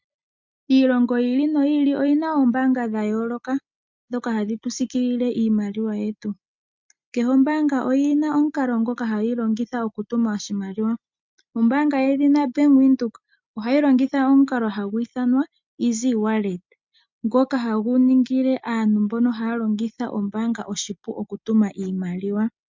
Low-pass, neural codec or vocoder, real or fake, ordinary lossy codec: 7.2 kHz; none; real; MP3, 64 kbps